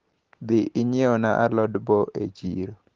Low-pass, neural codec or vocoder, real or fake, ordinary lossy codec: 7.2 kHz; none; real; Opus, 16 kbps